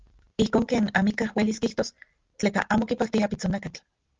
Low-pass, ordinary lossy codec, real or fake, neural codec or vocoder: 7.2 kHz; Opus, 32 kbps; real; none